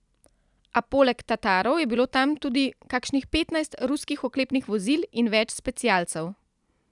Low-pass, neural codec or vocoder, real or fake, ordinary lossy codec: 10.8 kHz; none; real; none